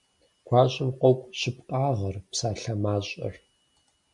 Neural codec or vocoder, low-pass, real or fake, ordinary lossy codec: none; 10.8 kHz; real; MP3, 64 kbps